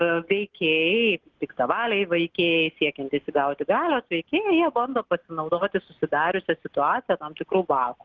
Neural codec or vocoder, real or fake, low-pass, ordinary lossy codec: none; real; 7.2 kHz; Opus, 16 kbps